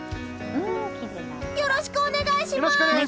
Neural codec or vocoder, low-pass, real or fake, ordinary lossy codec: none; none; real; none